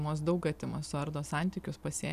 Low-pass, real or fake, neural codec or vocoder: 14.4 kHz; real; none